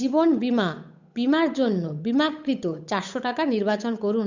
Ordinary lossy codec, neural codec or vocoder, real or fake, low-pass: none; codec, 16 kHz, 8 kbps, FunCodec, trained on Chinese and English, 25 frames a second; fake; 7.2 kHz